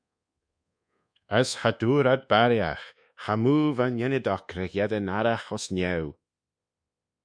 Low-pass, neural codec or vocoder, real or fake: 9.9 kHz; codec, 24 kHz, 1.2 kbps, DualCodec; fake